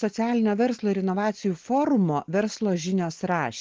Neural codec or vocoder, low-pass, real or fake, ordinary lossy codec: none; 7.2 kHz; real; Opus, 16 kbps